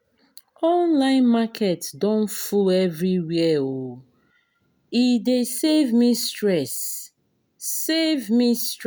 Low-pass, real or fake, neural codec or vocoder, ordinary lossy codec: none; real; none; none